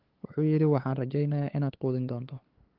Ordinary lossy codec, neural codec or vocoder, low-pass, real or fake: Opus, 16 kbps; codec, 16 kHz, 8 kbps, FunCodec, trained on LibriTTS, 25 frames a second; 5.4 kHz; fake